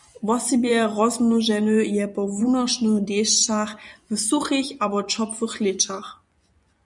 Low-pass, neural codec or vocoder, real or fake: 10.8 kHz; vocoder, 24 kHz, 100 mel bands, Vocos; fake